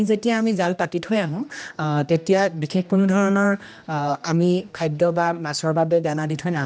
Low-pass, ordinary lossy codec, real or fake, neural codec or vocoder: none; none; fake; codec, 16 kHz, 1 kbps, X-Codec, HuBERT features, trained on general audio